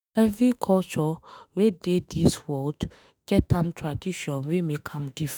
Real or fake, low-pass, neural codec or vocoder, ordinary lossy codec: fake; none; autoencoder, 48 kHz, 32 numbers a frame, DAC-VAE, trained on Japanese speech; none